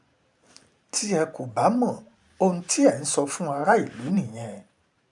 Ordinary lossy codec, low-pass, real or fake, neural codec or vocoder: none; 10.8 kHz; fake; vocoder, 44.1 kHz, 128 mel bands every 512 samples, BigVGAN v2